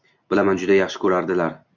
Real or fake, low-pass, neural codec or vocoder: real; 7.2 kHz; none